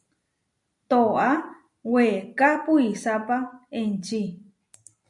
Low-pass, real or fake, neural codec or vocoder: 10.8 kHz; real; none